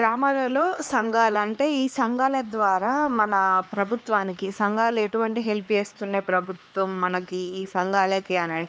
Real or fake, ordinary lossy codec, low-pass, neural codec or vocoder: fake; none; none; codec, 16 kHz, 2 kbps, X-Codec, HuBERT features, trained on LibriSpeech